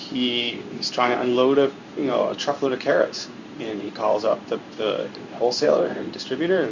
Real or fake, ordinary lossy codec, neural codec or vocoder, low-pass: fake; Opus, 64 kbps; codec, 16 kHz in and 24 kHz out, 1 kbps, XY-Tokenizer; 7.2 kHz